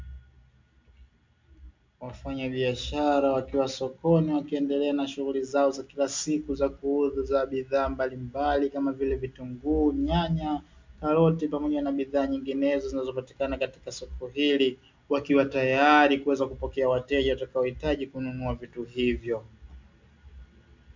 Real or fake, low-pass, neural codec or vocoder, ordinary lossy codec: real; 7.2 kHz; none; MP3, 64 kbps